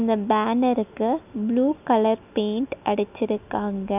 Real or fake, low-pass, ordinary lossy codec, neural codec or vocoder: real; 3.6 kHz; none; none